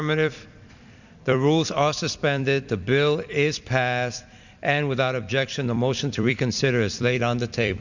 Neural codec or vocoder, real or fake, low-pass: vocoder, 44.1 kHz, 128 mel bands every 256 samples, BigVGAN v2; fake; 7.2 kHz